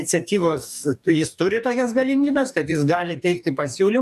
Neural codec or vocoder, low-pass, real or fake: codec, 44.1 kHz, 2.6 kbps, DAC; 14.4 kHz; fake